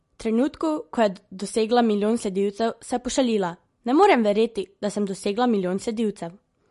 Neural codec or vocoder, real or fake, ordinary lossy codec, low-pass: none; real; MP3, 48 kbps; 14.4 kHz